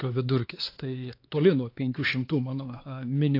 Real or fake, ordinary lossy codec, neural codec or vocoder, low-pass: fake; AAC, 32 kbps; codec, 16 kHz, 4 kbps, X-Codec, HuBERT features, trained on LibriSpeech; 5.4 kHz